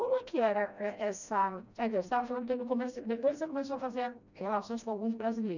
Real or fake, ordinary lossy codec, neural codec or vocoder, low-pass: fake; none; codec, 16 kHz, 1 kbps, FreqCodec, smaller model; 7.2 kHz